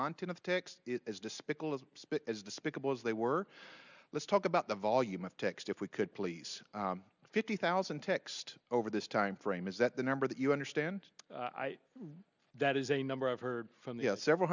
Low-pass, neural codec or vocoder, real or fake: 7.2 kHz; none; real